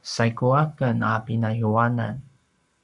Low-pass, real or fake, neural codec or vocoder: 10.8 kHz; fake; codec, 44.1 kHz, 7.8 kbps, Pupu-Codec